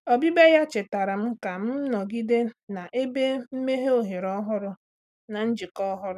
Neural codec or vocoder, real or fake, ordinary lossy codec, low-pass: none; real; none; 14.4 kHz